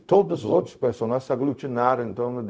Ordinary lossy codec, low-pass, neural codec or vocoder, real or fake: none; none; codec, 16 kHz, 0.4 kbps, LongCat-Audio-Codec; fake